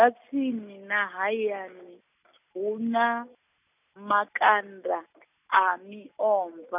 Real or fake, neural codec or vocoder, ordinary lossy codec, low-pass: real; none; none; 3.6 kHz